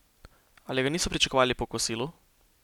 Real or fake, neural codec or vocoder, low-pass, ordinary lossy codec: real; none; 19.8 kHz; none